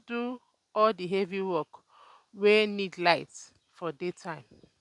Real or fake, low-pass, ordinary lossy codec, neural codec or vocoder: real; 10.8 kHz; none; none